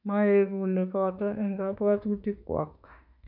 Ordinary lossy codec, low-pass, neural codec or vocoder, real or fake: none; 5.4 kHz; autoencoder, 48 kHz, 32 numbers a frame, DAC-VAE, trained on Japanese speech; fake